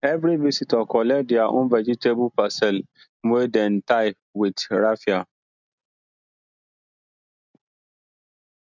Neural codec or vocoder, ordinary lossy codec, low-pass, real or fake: none; none; 7.2 kHz; real